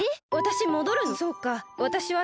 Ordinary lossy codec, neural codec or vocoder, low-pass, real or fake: none; none; none; real